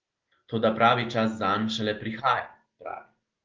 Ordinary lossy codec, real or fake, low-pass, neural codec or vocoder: Opus, 16 kbps; real; 7.2 kHz; none